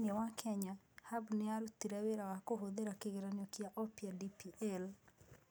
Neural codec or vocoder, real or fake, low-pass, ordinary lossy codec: none; real; none; none